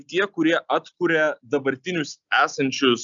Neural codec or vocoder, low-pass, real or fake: none; 7.2 kHz; real